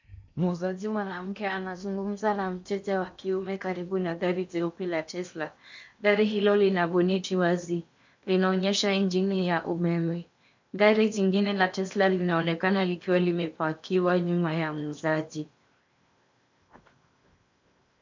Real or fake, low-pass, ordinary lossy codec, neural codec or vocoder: fake; 7.2 kHz; MP3, 64 kbps; codec, 16 kHz in and 24 kHz out, 0.8 kbps, FocalCodec, streaming, 65536 codes